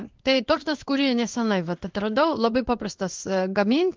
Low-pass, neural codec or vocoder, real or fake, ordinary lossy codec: 7.2 kHz; codec, 16 kHz in and 24 kHz out, 1 kbps, XY-Tokenizer; fake; Opus, 32 kbps